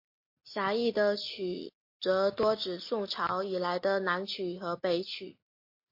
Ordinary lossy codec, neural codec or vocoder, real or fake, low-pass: MP3, 32 kbps; none; real; 5.4 kHz